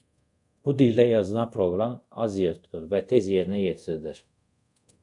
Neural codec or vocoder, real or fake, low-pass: codec, 24 kHz, 0.5 kbps, DualCodec; fake; 10.8 kHz